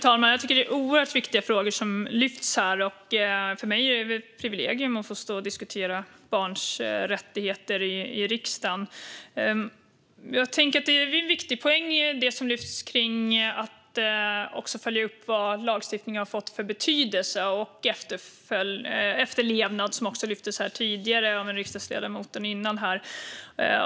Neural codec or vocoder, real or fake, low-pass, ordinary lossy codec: none; real; none; none